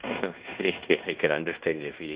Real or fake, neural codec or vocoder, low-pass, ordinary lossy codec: fake; codec, 16 kHz in and 24 kHz out, 0.9 kbps, LongCat-Audio-Codec, fine tuned four codebook decoder; 3.6 kHz; Opus, 64 kbps